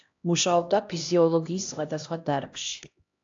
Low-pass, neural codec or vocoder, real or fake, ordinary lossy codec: 7.2 kHz; codec, 16 kHz, 1 kbps, X-Codec, HuBERT features, trained on LibriSpeech; fake; AAC, 48 kbps